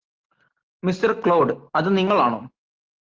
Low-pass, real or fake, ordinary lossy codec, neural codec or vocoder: 7.2 kHz; real; Opus, 16 kbps; none